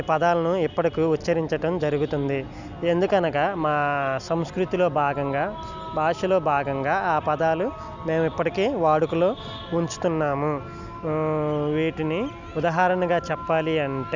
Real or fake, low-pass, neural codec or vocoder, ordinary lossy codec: real; 7.2 kHz; none; none